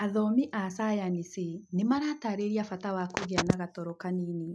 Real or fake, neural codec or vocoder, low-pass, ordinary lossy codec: real; none; none; none